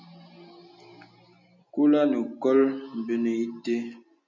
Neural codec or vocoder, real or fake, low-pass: none; real; 7.2 kHz